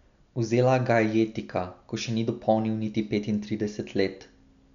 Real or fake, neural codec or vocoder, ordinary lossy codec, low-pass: real; none; none; 7.2 kHz